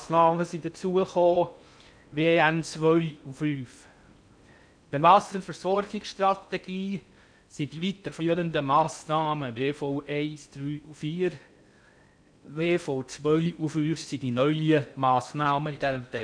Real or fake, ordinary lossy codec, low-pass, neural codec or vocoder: fake; none; 9.9 kHz; codec, 16 kHz in and 24 kHz out, 0.6 kbps, FocalCodec, streaming, 2048 codes